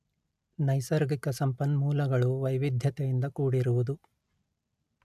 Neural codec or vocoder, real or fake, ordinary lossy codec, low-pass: none; real; none; 14.4 kHz